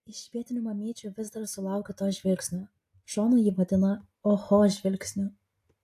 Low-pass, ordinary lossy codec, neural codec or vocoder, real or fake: 14.4 kHz; AAC, 64 kbps; none; real